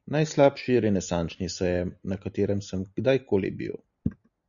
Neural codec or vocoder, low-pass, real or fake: none; 7.2 kHz; real